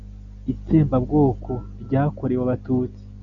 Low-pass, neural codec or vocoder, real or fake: 7.2 kHz; none; real